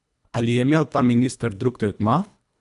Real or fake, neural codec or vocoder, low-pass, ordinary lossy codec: fake; codec, 24 kHz, 1.5 kbps, HILCodec; 10.8 kHz; none